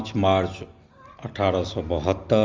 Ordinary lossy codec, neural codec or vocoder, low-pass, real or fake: Opus, 32 kbps; none; 7.2 kHz; real